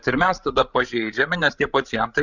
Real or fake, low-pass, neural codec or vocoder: fake; 7.2 kHz; codec, 16 kHz, 16 kbps, FreqCodec, larger model